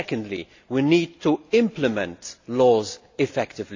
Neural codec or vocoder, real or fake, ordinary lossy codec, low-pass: none; real; AAC, 48 kbps; 7.2 kHz